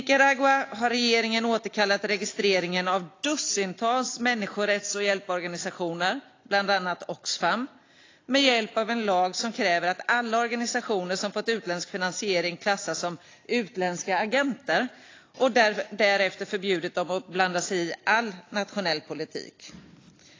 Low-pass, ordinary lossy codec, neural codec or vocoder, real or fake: 7.2 kHz; AAC, 32 kbps; none; real